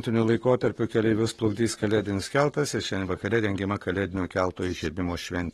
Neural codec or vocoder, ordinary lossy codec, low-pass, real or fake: codec, 44.1 kHz, 7.8 kbps, Pupu-Codec; AAC, 32 kbps; 19.8 kHz; fake